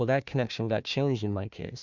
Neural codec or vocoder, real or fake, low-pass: codec, 16 kHz, 1 kbps, FunCodec, trained on Chinese and English, 50 frames a second; fake; 7.2 kHz